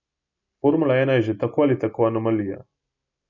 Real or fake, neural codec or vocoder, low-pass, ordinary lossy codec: real; none; 7.2 kHz; none